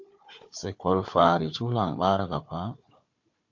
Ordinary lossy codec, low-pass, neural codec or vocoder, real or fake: MP3, 48 kbps; 7.2 kHz; codec, 16 kHz, 4 kbps, FunCodec, trained on Chinese and English, 50 frames a second; fake